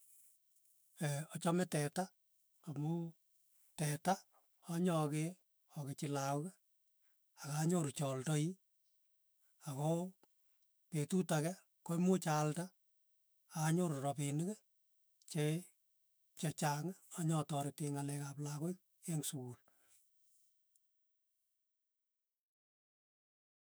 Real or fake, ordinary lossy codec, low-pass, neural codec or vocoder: fake; none; none; autoencoder, 48 kHz, 128 numbers a frame, DAC-VAE, trained on Japanese speech